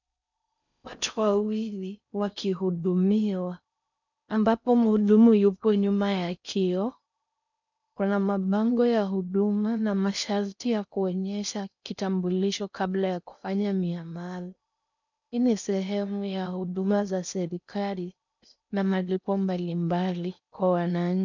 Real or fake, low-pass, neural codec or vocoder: fake; 7.2 kHz; codec, 16 kHz in and 24 kHz out, 0.6 kbps, FocalCodec, streaming, 4096 codes